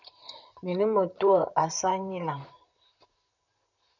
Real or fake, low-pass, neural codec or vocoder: fake; 7.2 kHz; vocoder, 44.1 kHz, 128 mel bands, Pupu-Vocoder